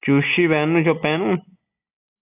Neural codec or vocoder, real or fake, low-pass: none; real; 3.6 kHz